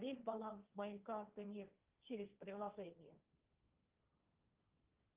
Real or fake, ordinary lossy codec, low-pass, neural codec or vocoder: fake; Opus, 24 kbps; 3.6 kHz; codec, 16 kHz, 1.1 kbps, Voila-Tokenizer